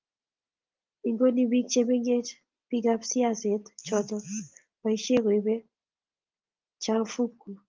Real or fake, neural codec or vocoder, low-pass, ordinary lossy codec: real; none; 7.2 kHz; Opus, 24 kbps